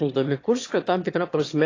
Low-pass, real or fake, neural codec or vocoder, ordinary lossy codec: 7.2 kHz; fake; autoencoder, 22.05 kHz, a latent of 192 numbers a frame, VITS, trained on one speaker; AAC, 32 kbps